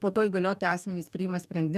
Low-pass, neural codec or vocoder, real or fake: 14.4 kHz; codec, 44.1 kHz, 2.6 kbps, DAC; fake